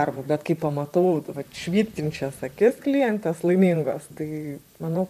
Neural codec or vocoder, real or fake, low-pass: vocoder, 44.1 kHz, 128 mel bands, Pupu-Vocoder; fake; 14.4 kHz